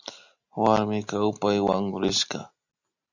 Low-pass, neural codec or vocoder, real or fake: 7.2 kHz; none; real